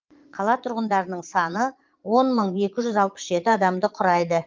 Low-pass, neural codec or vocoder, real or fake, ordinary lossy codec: 7.2 kHz; vocoder, 22.05 kHz, 80 mel bands, Vocos; fake; Opus, 32 kbps